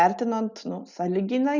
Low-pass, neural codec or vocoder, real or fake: 7.2 kHz; none; real